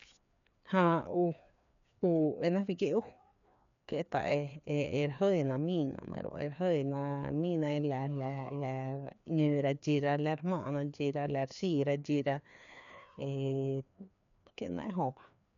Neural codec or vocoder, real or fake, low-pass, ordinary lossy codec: codec, 16 kHz, 2 kbps, FreqCodec, larger model; fake; 7.2 kHz; none